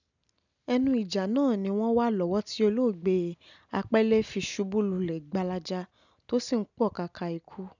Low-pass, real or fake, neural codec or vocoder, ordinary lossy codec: 7.2 kHz; real; none; none